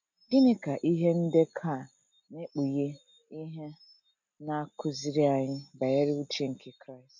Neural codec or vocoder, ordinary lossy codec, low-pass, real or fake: none; none; 7.2 kHz; real